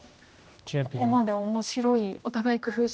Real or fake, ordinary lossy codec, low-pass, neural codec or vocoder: fake; none; none; codec, 16 kHz, 1 kbps, X-Codec, HuBERT features, trained on general audio